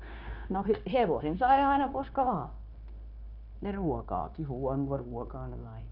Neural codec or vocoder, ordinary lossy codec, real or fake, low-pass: codec, 16 kHz, 0.9 kbps, LongCat-Audio-Codec; none; fake; 5.4 kHz